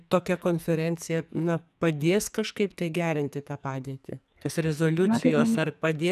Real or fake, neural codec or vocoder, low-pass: fake; codec, 44.1 kHz, 2.6 kbps, SNAC; 14.4 kHz